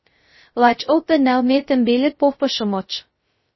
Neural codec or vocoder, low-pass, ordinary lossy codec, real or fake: codec, 16 kHz, 0.2 kbps, FocalCodec; 7.2 kHz; MP3, 24 kbps; fake